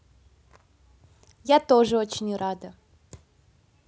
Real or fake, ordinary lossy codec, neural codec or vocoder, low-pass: real; none; none; none